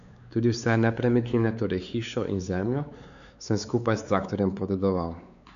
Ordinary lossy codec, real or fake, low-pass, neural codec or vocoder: none; fake; 7.2 kHz; codec, 16 kHz, 4 kbps, X-Codec, WavLM features, trained on Multilingual LibriSpeech